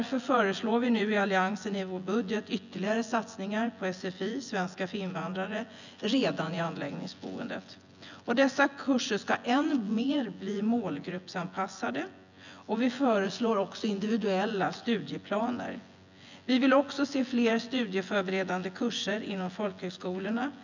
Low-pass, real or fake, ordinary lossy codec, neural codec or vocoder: 7.2 kHz; fake; none; vocoder, 24 kHz, 100 mel bands, Vocos